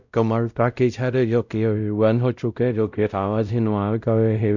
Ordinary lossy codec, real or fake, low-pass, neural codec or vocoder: none; fake; 7.2 kHz; codec, 16 kHz, 0.5 kbps, X-Codec, WavLM features, trained on Multilingual LibriSpeech